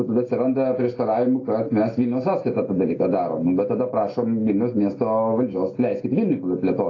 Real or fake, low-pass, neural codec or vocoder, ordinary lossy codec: real; 7.2 kHz; none; AAC, 32 kbps